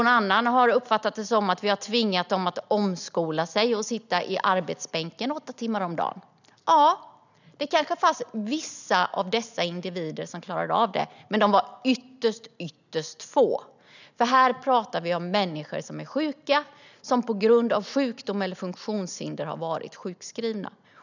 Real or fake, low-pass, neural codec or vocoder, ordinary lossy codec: real; 7.2 kHz; none; none